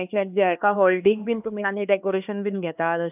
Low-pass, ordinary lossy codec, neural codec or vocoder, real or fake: 3.6 kHz; none; codec, 16 kHz, 2 kbps, X-Codec, HuBERT features, trained on LibriSpeech; fake